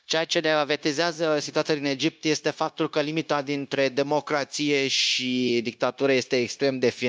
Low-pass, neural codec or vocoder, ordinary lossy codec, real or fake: none; codec, 16 kHz, 0.9 kbps, LongCat-Audio-Codec; none; fake